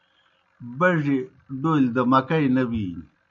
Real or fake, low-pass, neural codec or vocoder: real; 7.2 kHz; none